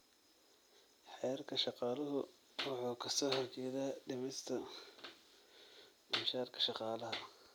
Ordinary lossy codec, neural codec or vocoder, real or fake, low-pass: none; none; real; none